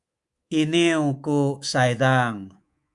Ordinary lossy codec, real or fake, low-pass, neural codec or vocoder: Opus, 64 kbps; fake; 10.8 kHz; codec, 24 kHz, 3.1 kbps, DualCodec